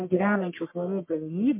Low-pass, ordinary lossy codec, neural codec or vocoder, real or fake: 3.6 kHz; MP3, 24 kbps; codec, 44.1 kHz, 1.7 kbps, Pupu-Codec; fake